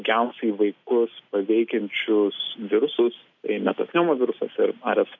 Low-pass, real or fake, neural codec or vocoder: 7.2 kHz; real; none